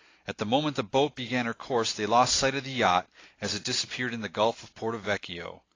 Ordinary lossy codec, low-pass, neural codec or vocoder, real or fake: AAC, 32 kbps; 7.2 kHz; none; real